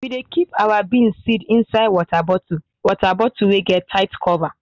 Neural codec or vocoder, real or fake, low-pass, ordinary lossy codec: none; real; 7.2 kHz; none